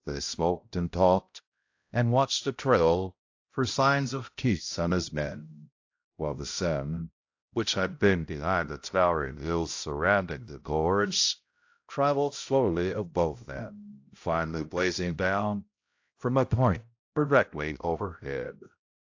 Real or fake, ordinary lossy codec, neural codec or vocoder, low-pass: fake; AAC, 48 kbps; codec, 16 kHz, 0.5 kbps, X-Codec, HuBERT features, trained on balanced general audio; 7.2 kHz